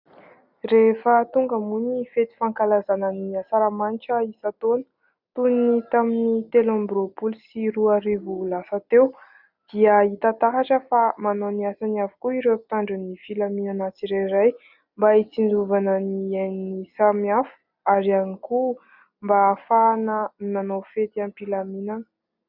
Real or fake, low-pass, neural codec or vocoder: real; 5.4 kHz; none